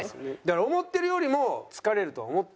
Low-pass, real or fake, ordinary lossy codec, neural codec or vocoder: none; real; none; none